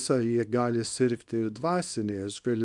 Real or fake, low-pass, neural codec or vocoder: fake; 10.8 kHz; codec, 24 kHz, 0.9 kbps, WavTokenizer, small release